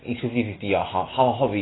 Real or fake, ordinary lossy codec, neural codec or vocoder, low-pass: real; AAC, 16 kbps; none; 7.2 kHz